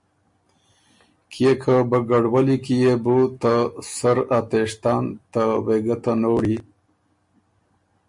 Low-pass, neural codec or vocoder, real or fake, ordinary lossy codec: 10.8 kHz; none; real; MP3, 48 kbps